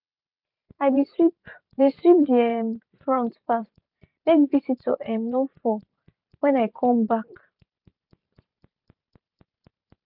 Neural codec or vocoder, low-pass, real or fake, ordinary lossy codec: vocoder, 44.1 kHz, 128 mel bands every 512 samples, BigVGAN v2; 5.4 kHz; fake; none